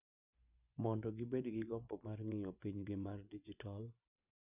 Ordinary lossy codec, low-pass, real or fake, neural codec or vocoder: none; 3.6 kHz; fake; vocoder, 24 kHz, 100 mel bands, Vocos